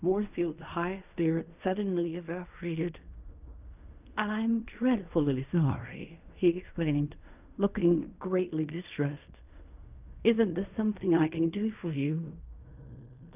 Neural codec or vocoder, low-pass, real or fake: codec, 16 kHz in and 24 kHz out, 0.4 kbps, LongCat-Audio-Codec, fine tuned four codebook decoder; 3.6 kHz; fake